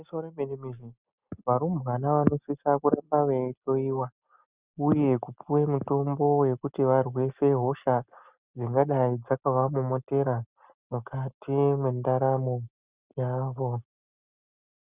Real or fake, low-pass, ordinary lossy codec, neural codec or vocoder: real; 3.6 kHz; Opus, 64 kbps; none